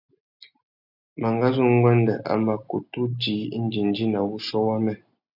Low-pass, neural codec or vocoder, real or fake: 5.4 kHz; none; real